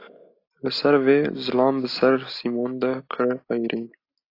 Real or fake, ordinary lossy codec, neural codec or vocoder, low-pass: real; AAC, 32 kbps; none; 5.4 kHz